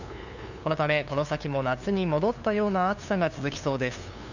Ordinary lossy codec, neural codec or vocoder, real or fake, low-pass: none; codec, 16 kHz, 2 kbps, FunCodec, trained on LibriTTS, 25 frames a second; fake; 7.2 kHz